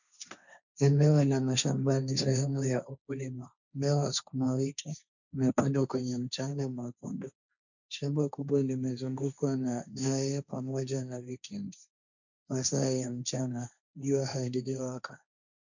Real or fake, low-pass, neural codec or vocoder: fake; 7.2 kHz; codec, 16 kHz, 1.1 kbps, Voila-Tokenizer